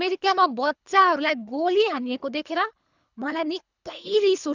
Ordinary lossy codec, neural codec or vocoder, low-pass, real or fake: none; codec, 24 kHz, 3 kbps, HILCodec; 7.2 kHz; fake